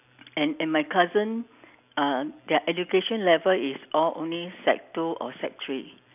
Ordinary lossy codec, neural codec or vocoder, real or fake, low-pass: none; none; real; 3.6 kHz